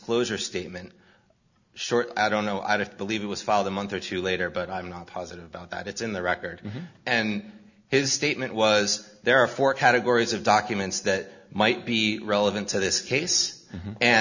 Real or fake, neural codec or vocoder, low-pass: real; none; 7.2 kHz